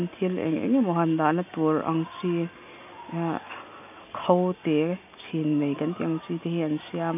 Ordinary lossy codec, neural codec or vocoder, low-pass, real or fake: none; none; 3.6 kHz; real